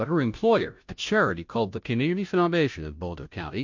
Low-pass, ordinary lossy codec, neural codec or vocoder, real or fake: 7.2 kHz; MP3, 48 kbps; codec, 16 kHz, 0.5 kbps, FunCodec, trained on Chinese and English, 25 frames a second; fake